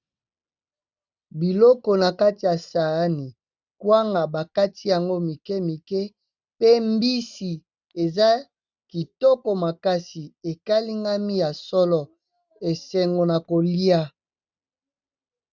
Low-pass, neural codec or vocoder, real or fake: 7.2 kHz; none; real